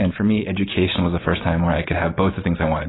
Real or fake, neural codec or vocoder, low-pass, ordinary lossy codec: real; none; 7.2 kHz; AAC, 16 kbps